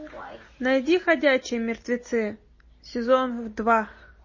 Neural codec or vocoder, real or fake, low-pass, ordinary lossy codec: none; real; 7.2 kHz; MP3, 32 kbps